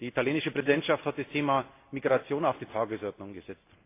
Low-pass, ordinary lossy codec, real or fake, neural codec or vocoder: 3.6 kHz; AAC, 24 kbps; real; none